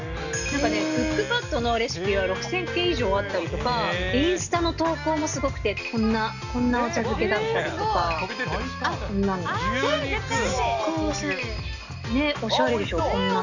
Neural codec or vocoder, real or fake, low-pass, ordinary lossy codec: none; real; 7.2 kHz; none